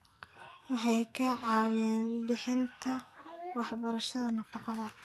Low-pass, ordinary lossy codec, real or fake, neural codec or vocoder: 14.4 kHz; none; fake; codec, 44.1 kHz, 2.6 kbps, SNAC